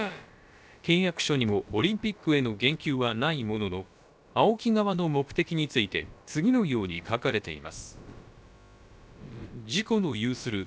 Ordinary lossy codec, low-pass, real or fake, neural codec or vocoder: none; none; fake; codec, 16 kHz, about 1 kbps, DyCAST, with the encoder's durations